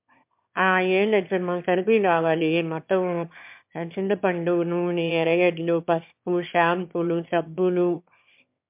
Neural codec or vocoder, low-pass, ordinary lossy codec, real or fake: autoencoder, 22.05 kHz, a latent of 192 numbers a frame, VITS, trained on one speaker; 3.6 kHz; MP3, 32 kbps; fake